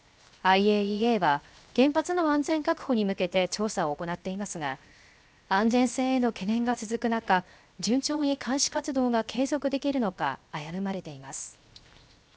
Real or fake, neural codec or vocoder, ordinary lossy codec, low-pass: fake; codec, 16 kHz, 0.7 kbps, FocalCodec; none; none